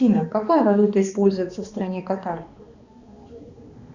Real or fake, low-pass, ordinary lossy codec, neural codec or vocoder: fake; 7.2 kHz; Opus, 64 kbps; codec, 16 kHz, 2 kbps, X-Codec, HuBERT features, trained on balanced general audio